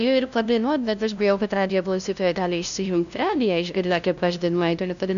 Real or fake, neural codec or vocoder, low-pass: fake; codec, 16 kHz, 0.5 kbps, FunCodec, trained on LibriTTS, 25 frames a second; 7.2 kHz